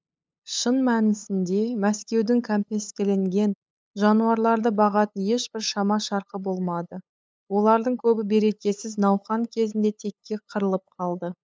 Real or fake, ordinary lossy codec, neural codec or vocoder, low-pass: fake; none; codec, 16 kHz, 8 kbps, FunCodec, trained on LibriTTS, 25 frames a second; none